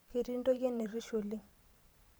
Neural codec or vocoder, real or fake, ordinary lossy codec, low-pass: vocoder, 44.1 kHz, 128 mel bands every 512 samples, BigVGAN v2; fake; none; none